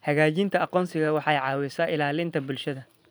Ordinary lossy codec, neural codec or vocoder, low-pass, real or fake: none; vocoder, 44.1 kHz, 128 mel bands every 512 samples, BigVGAN v2; none; fake